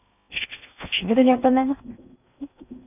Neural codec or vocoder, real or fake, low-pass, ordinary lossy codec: codec, 16 kHz in and 24 kHz out, 0.6 kbps, FocalCodec, streaming, 2048 codes; fake; 3.6 kHz; none